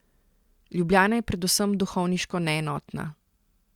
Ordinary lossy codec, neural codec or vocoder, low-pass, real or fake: Opus, 64 kbps; none; 19.8 kHz; real